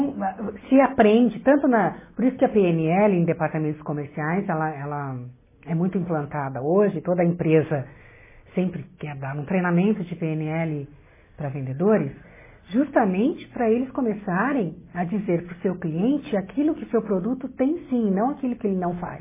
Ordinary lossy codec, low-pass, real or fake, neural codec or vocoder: MP3, 16 kbps; 3.6 kHz; real; none